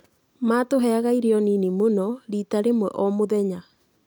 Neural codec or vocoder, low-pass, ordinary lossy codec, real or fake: none; none; none; real